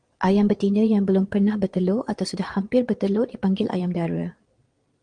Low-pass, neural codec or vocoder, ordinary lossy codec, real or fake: 9.9 kHz; vocoder, 22.05 kHz, 80 mel bands, Vocos; Opus, 24 kbps; fake